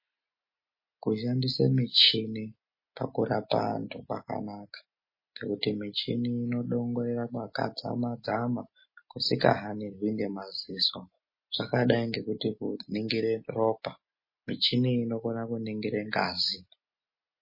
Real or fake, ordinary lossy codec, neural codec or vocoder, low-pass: real; MP3, 24 kbps; none; 5.4 kHz